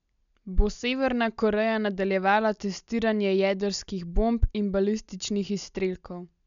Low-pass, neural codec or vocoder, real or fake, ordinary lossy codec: 7.2 kHz; none; real; none